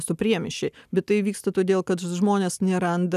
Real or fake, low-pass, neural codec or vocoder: real; 14.4 kHz; none